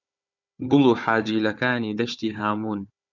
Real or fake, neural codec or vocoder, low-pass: fake; codec, 16 kHz, 4 kbps, FunCodec, trained on Chinese and English, 50 frames a second; 7.2 kHz